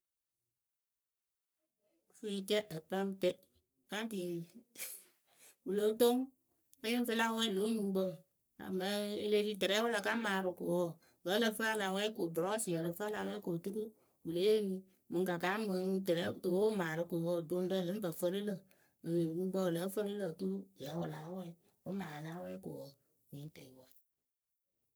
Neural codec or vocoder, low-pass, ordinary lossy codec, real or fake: codec, 44.1 kHz, 3.4 kbps, Pupu-Codec; none; none; fake